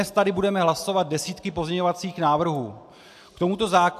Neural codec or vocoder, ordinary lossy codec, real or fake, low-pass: none; AAC, 96 kbps; real; 14.4 kHz